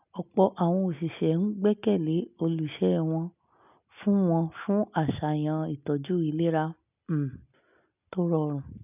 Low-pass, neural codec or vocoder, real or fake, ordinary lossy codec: 3.6 kHz; none; real; none